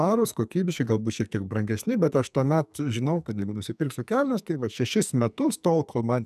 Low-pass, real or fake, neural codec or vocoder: 14.4 kHz; fake; codec, 44.1 kHz, 2.6 kbps, SNAC